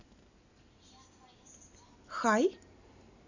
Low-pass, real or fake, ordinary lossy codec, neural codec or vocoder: 7.2 kHz; real; none; none